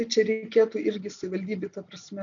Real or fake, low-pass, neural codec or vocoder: real; 7.2 kHz; none